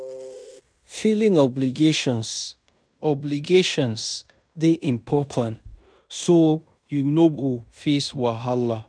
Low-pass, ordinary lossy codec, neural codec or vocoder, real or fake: 9.9 kHz; none; codec, 16 kHz in and 24 kHz out, 0.9 kbps, LongCat-Audio-Codec, fine tuned four codebook decoder; fake